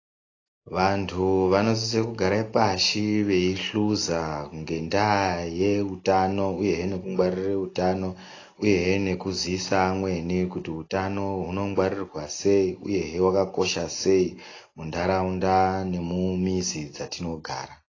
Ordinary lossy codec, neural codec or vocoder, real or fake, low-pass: AAC, 32 kbps; none; real; 7.2 kHz